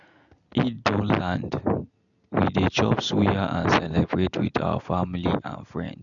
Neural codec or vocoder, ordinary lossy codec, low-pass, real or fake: none; none; 7.2 kHz; real